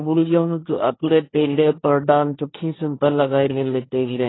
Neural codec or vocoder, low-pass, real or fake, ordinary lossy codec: codec, 16 kHz, 1.1 kbps, Voila-Tokenizer; 7.2 kHz; fake; AAC, 16 kbps